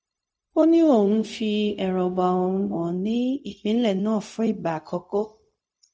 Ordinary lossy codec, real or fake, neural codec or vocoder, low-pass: none; fake; codec, 16 kHz, 0.4 kbps, LongCat-Audio-Codec; none